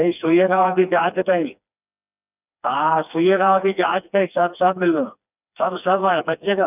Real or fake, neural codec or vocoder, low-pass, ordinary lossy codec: fake; codec, 16 kHz, 2 kbps, FreqCodec, smaller model; 3.6 kHz; none